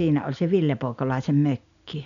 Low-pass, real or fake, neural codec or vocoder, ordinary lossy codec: 7.2 kHz; real; none; none